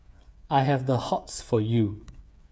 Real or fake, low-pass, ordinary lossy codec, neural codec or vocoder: fake; none; none; codec, 16 kHz, 8 kbps, FreqCodec, smaller model